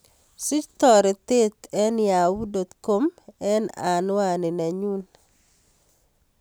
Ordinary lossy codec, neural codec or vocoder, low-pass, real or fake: none; none; none; real